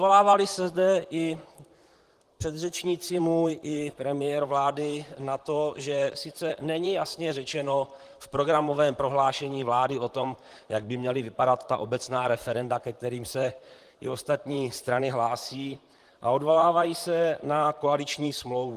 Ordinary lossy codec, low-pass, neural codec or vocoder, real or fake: Opus, 24 kbps; 14.4 kHz; vocoder, 44.1 kHz, 128 mel bands, Pupu-Vocoder; fake